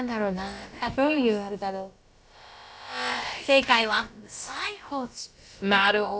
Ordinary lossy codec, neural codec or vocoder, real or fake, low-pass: none; codec, 16 kHz, about 1 kbps, DyCAST, with the encoder's durations; fake; none